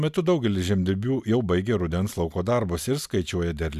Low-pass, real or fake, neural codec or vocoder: 14.4 kHz; real; none